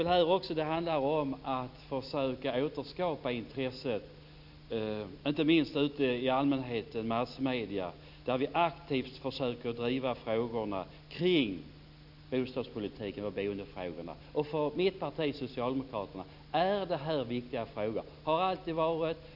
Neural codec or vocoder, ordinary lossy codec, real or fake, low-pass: none; none; real; 5.4 kHz